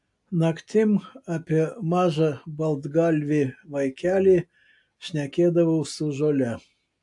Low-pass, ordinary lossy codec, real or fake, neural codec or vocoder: 10.8 kHz; AAC, 64 kbps; real; none